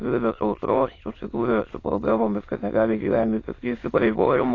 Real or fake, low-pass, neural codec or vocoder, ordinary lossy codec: fake; 7.2 kHz; autoencoder, 22.05 kHz, a latent of 192 numbers a frame, VITS, trained on many speakers; AAC, 32 kbps